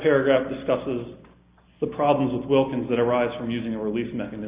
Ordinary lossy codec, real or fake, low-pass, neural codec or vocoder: Opus, 64 kbps; real; 3.6 kHz; none